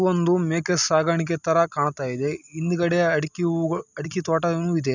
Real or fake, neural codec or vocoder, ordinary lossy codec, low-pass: real; none; none; 7.2 kHz